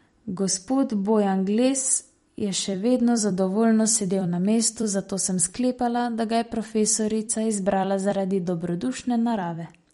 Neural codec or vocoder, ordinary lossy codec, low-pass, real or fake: vocoder, 44.1 kHz, 128 mel bands every 256 samples, BigVGAN v2; MP3, 48 kbps; 19.8 kHz; fake